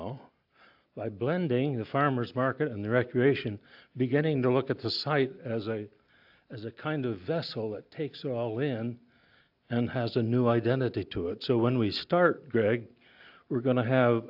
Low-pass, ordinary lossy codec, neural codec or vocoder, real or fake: 5.4 kHz; AAC, 48 kbps; none; real